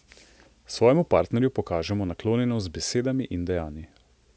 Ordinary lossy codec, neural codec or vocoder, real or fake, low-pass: none; none; real; none